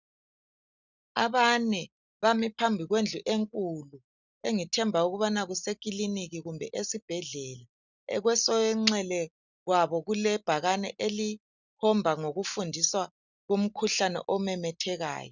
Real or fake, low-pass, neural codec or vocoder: real; 7.2 kHz; none